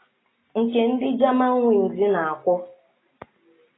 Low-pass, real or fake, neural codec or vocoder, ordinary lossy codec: 7.2 kHz; real; none; AAC, 16 kbps